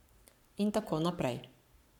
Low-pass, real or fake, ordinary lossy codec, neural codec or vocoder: 19.8 kHz; fake; none; codec, 44.1 kHz, 7.8 kbps, Pupu-Codec